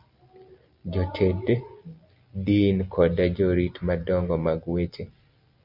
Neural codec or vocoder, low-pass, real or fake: none; 5.4 kHz; real